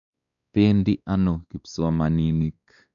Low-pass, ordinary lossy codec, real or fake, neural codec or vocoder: 7.2 kHz; none; fake; codec, 16 kHz, 2 kbps, X-Codec, WavLM features, trained on Multilingual LibriSpeech